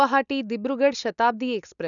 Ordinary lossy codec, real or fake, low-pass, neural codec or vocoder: none; real; 7.2 kHz; none